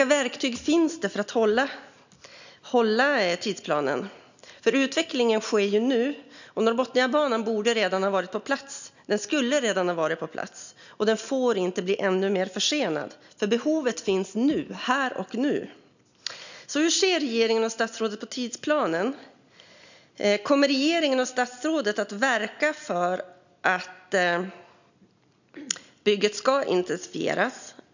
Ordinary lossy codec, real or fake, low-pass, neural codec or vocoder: none; real; 7.2 kHz; none